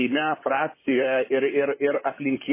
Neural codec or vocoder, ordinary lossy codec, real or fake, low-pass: vocoder, 44.1 kHz, 128 mel bands, Pupu-Vocoder; MP3, 16 kbps; fake; 3.6 kHz